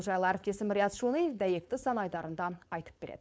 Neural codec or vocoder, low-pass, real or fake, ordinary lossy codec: codec, 16 kHz, 4.8 kbps, FACodec; none; fake; none